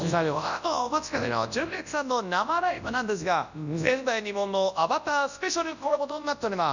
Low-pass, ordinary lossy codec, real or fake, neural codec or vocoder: 7.2 kHz; none; fake; codec, 24 kHz, 0.9 kbps, WavTokenizer, large speech release